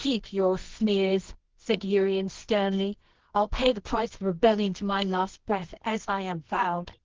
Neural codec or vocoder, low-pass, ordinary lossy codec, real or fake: codec, 24 kHz, 0.9 kbps, WavTokenizer, medium music audio release; 7.2 kHz; Opus, 16 kbps; fake